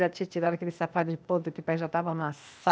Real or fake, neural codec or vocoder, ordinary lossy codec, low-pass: fake; codec, 16 kHz, 0.8 kbps, ZipCodec; none; none